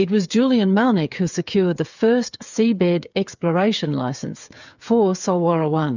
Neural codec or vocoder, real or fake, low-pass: codec, 16 kHz, 8 kbps, FreqCodec, smaller model; fake; 7.2 kHz